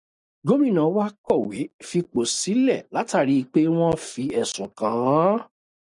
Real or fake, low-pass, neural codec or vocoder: real; 10.8 kHz; none